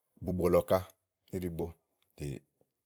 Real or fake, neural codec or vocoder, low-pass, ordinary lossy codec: fake; vocoder, 44.1 kHz, 128 mel bands every 256 samples, BigVGAN v2; none; none